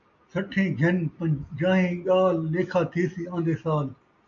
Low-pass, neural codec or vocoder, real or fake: 7.2 kHz; none; real